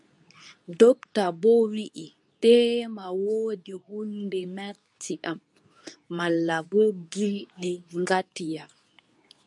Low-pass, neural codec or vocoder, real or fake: 10.8 kHz; codec, 24 kHz, 0.9 kbps, WavTokenizer, medium speech release version 2; fake